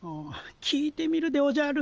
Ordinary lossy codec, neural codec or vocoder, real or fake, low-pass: Opus, 24 kbps; none; real; 7.2 kHz